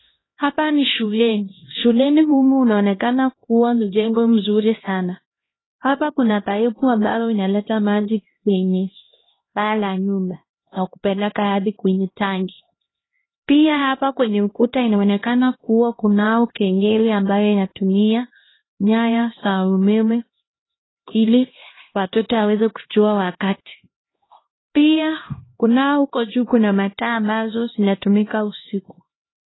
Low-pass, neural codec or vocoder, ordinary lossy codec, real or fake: 7.2 kHz; codec, 16 kHz, 1 kbps, X-Codec, HuBERT features, trained on LibriSpeech; AAC, 16 kbps; fake